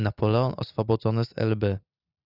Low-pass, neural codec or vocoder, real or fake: 5.4 kHz; none; real